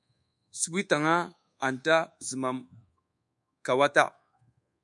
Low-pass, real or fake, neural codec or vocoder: 10.8 kHz; fake; codec, 24 kHz, 1.2 kbps, DualCodec